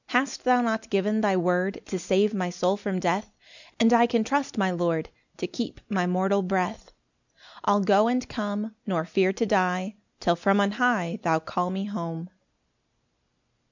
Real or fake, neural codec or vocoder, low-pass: real; none; 7.2 kHz